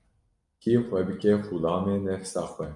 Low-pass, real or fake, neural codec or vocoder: 10.8 kHz; real; none